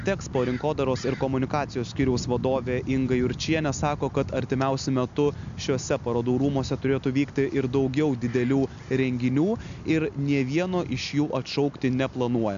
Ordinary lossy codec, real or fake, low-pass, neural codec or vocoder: MP3, 64 kbps; real; 7.2 kHz; none